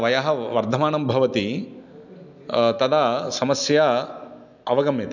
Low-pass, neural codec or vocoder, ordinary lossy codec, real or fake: 7.2 kHz; none; none; real